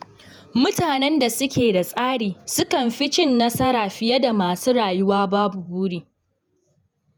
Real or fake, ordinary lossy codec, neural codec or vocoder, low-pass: fake; none; vocoder, 48 kHz, 128 mel bands, Vocos; none